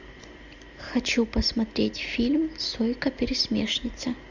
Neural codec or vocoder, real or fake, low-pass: none; real; 7.2 kHz